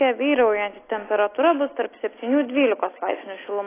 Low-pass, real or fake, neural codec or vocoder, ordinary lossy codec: 3.6 kHz; real; none; AAC, 16 kbps